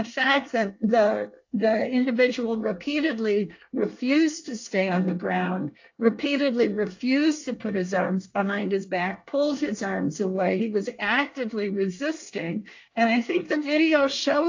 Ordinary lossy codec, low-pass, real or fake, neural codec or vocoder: AAC, 48 kbps; 7.2 kHz; fake; codec, 24 kHz, 1 kbps, SNAC